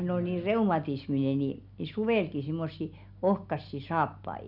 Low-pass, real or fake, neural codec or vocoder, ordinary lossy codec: 5.4 kHz; real; none; none